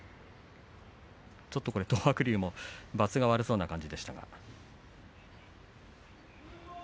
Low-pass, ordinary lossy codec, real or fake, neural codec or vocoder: none; none; real; none